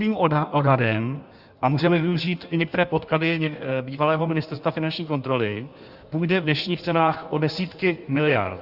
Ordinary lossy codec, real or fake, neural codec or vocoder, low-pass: Opus, 64 kbps; fake; codec, 16 kHz in and 24 kHz out, 1.1 kbps, FireRedTTS-2 codec; 5.4 kHz